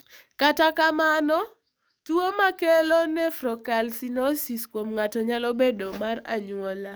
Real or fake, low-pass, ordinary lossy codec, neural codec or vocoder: fake; none; none; codec, 44.1 kHz, 7.8 kbps, DAC